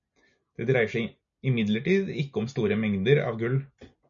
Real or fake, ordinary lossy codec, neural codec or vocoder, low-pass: real; MP3, 48 kbps; none; 7.2 kHz